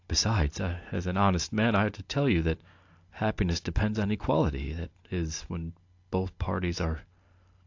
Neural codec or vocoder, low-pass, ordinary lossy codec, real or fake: none; 7.2 kHz; AAC, 48 kbps; real